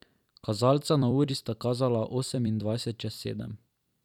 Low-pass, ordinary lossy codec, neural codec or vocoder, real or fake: 19.8 kHz; none; vocoder, 44.1 kHz, 128 mel bands every 256 samples, BigVGAN v2; fake